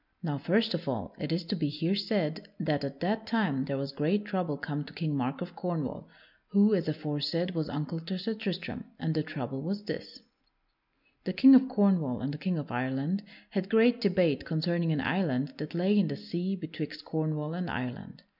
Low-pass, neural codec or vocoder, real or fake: 5.4 kHz; none; real